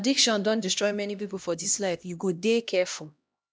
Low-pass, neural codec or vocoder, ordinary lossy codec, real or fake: none; codec, 16 kHz, 1 kbps, X-Codec, HuBERT features, trained on LibriSpeech; none; fake